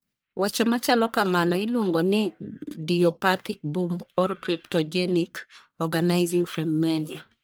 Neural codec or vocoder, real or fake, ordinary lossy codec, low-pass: codec, 44.1 kHz, 1.7 kbps, Pupu-Codec; fake; none; none